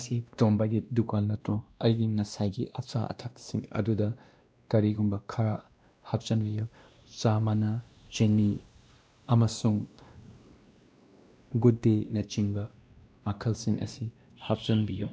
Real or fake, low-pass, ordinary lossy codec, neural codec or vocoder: fake; none; none; codec, 16 kHz, 1 kbps, X-Codec, WavLM features, trained on Multilingual LibriSpeech